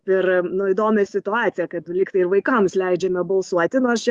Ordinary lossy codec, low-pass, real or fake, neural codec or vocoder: Opus, 64 kbps; 10.8 kHz; fake; autoencoder, 48 kHz, 128 numbers a frame, DAC-VAE, trained on Japanese speech